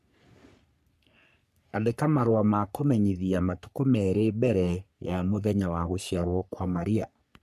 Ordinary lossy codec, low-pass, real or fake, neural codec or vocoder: none; 14.4 kHz; fake; codec, 44.1 kHz, 3.4 kbps, Pupu-Codec